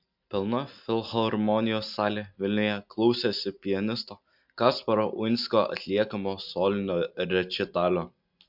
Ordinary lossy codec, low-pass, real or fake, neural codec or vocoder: MP3, 48 kbps; 5.4 kHz; real; none